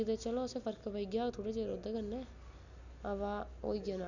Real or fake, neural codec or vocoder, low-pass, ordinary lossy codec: real; none; 7.2 kHz; none